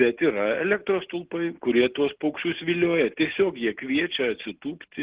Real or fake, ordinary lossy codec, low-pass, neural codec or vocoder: real; Opus, 16 kbps; 3.6 kHz; none